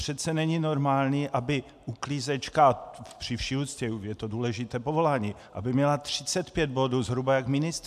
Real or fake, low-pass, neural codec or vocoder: real; 14.4 kHz; none